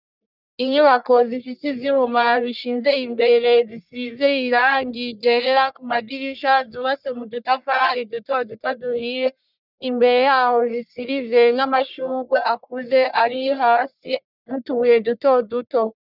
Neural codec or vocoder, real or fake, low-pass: codec, 44.1 kHz, 1.7 kbps, Pupu-Codec; fake; 5.4 kHz